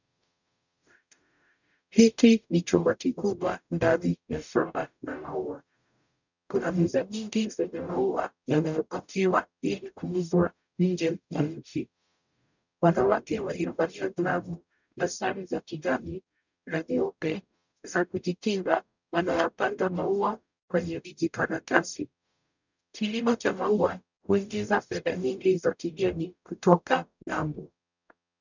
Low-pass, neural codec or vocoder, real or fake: 7.2 kHz; codec, 44.1 kHz, 0.9 kbps, DAC; fake